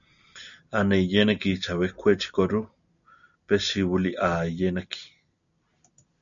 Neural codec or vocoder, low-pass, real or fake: none; 7.2 kHz; real